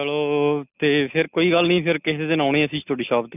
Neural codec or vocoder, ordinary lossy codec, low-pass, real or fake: none; none; 3.6 kHz; real